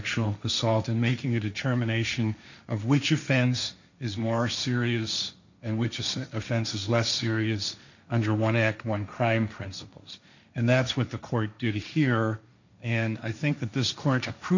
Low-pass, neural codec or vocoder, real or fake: 7.2 kHz; codec, 16 kHz, 1.1 kbps, Voila-Tokenizer; fake